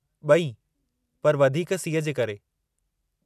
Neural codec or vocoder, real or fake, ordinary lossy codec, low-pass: none; real; none; 14.4 kHz